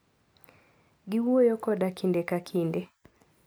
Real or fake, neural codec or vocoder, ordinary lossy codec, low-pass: real; none; none; none